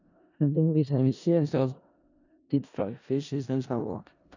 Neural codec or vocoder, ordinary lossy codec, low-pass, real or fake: codec, 16 kHz in and 24 kHz out, 0.4 kbps, LongCat-Audio-Codec, four codebook decoder; none; 7.2 kHz; fake